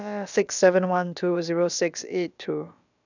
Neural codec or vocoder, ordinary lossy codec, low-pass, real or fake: codec, 16 kHz, about 1 kbps, DyCAST, with the encoder's durations; none; 7.2 kHz; fake